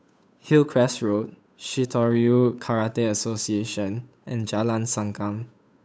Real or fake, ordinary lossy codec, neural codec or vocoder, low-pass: fake; none; codec, 16 kHz, 8 kbps, FunCodec, trained on Chinese and English, 25 frames a second; none